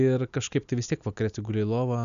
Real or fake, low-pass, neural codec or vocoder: real; 7.2 kHz; none